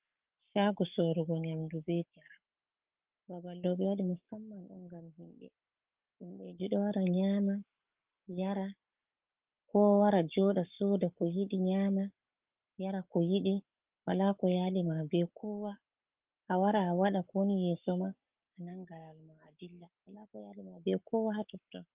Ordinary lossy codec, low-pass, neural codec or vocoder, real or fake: Opus, 24 kbps; 3.6 kHz; codec, 44.1 kHz, 7.8 kbps, Pupu-Codec; fake